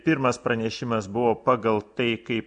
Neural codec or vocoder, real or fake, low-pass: none; real; 9.9 kHz